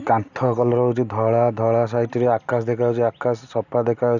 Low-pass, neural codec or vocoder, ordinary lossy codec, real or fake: 7.2 kHz; none; none; real